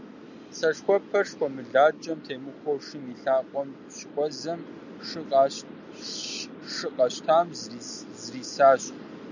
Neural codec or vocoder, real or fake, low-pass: none; real; 7.2 kHz